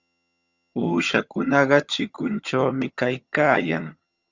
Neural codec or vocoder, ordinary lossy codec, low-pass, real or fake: vocoder, 22.05 kHz, 80 mel bands, HiFi-GAN; Opus, 64 kbps; 7.2 kHz; fake